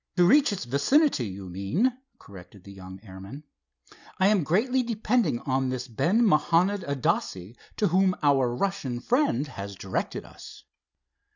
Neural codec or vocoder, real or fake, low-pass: none; real; 7.2 kHz